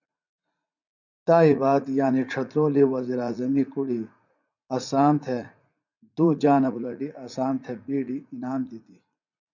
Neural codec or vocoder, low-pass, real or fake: vocoder, 44.1 kHz, 80 mel bands, Vocos; 7.2 kHz; fake